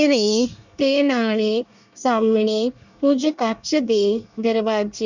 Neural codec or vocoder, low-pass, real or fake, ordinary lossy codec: codec, 24 kHz, 1 kbps, SNAC; 7.2 kHz; fake; none